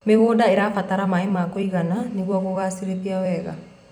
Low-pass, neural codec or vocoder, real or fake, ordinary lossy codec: 19.8 kHz; vocoder, 44.1 kHz, 128 mel bands every 256 samples, BigVGAN v2; fake; none